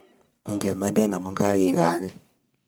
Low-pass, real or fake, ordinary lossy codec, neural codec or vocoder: none; fake; none; codec, 44.1 kHz, 1.7 kbps, Pupu-Codec